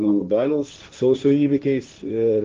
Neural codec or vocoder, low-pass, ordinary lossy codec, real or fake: codec, 16 kHz, 1.1 kbps, Voila-Tokenizer; 7.2 kHz; Opus, 32 kbps; fake